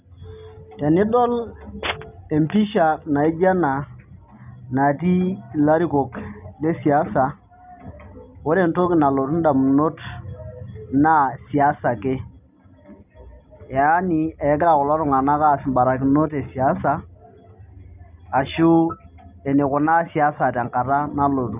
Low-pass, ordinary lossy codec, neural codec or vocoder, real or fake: 3.6 kHz; none; none; real